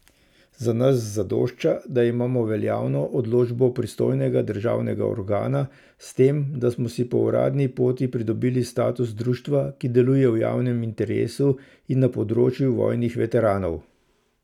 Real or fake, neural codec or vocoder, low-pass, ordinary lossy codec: real; none; 19.8 kHz; none